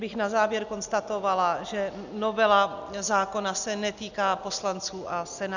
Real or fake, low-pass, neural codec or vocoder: real; 7.2 kHz; none